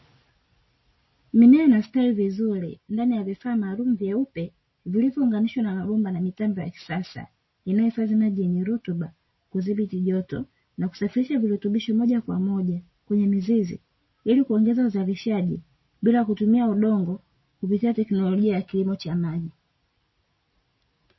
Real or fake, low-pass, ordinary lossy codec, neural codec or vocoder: real; 7.2 kHz; MP3, 24 kbps; none